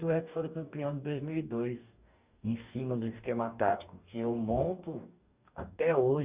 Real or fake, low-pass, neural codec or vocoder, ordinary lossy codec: fake; 3.6 kHz; codec, 44.1 kHz, 2.6 kbps, DAC; Opus, 64 kbps